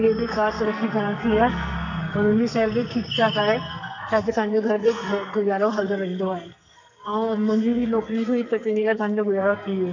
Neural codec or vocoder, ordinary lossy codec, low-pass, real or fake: codec, 44.1 kHz, 2.6 kbps, SNAC; none; 7.2 kHz; fake